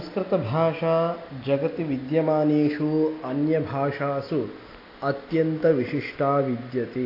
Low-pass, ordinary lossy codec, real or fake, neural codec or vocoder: 5.4 kHz; AAC, 32 kbps; real; none